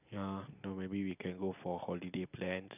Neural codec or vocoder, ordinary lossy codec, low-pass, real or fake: none; none; 3.6 kHz; real